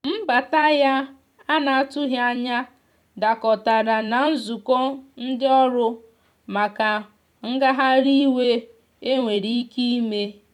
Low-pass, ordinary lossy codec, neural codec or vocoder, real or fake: 19.8 kHz; none; vocoder, 44.1 kHz, 128 mel bands every 256 samples, BigVGAN v2; fake